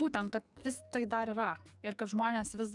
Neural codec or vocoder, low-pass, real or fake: codec, 44.1 kHz, 2.6 kbps, SNAC; 10.8 kHz; fake